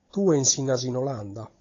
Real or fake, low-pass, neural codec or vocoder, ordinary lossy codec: fake; 7.2 kHz; codec, 16 kHz, 16 kbps, FunCodec, trained on Chinese and English, 50 frames a second; AAC, 32 kbps